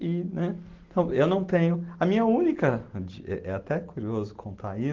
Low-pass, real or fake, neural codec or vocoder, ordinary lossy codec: 7.2 kHz; real; none; Opus, 16 kbps